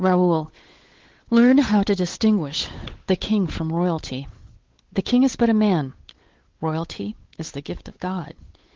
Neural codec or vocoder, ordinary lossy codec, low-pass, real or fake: codec, 16 kHz, 8 kbps, FunCodec, trained on Chinese and English, 25 frames a second; Opus, 16 kbps; 7.2 kHz; fake